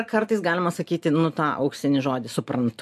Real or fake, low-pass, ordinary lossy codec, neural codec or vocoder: real; 14.4 kHz; MP3, 64 kbps; none